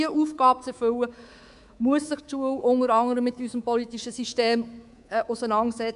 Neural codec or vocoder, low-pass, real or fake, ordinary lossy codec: codec, 24 kHz, 3.1 kbps, DualCodec; 10.8 kHz; fake; none